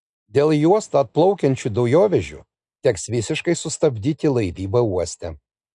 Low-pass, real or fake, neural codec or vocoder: 10.8 kHz; real; none